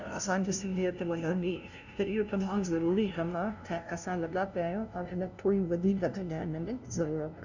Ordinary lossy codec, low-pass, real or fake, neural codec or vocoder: none; 7.2 kHz; fake; codec, 16 kHz, 0.5 kbps, FunCodec, trained on LibriTTS, 25 frames a second